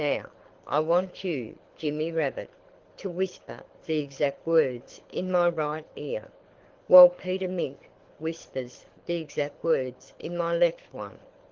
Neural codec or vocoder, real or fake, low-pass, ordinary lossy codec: codec, 24 kHz, 6 kbps, HILCodec; fake; 7.2 kHz; Opus, 16 kbps